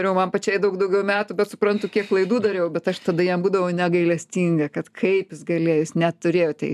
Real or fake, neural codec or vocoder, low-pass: real; none; 14.4 kHz